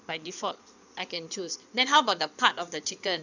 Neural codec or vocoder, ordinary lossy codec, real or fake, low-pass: codec, 16 kHz in and 24 kHz out, 2.2 kbps, FireRedTTS-2 codec; none; fake; 7.2 kHz